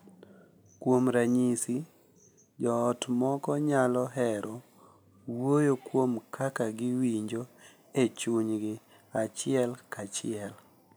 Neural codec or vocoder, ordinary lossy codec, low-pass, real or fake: none; none; none; real